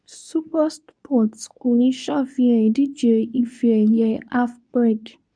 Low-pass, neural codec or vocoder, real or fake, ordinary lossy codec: 9.9 kHz; codec, 24 kHz, 0.9 kbps, WavTokenizer, medium speech release version 2; fake; none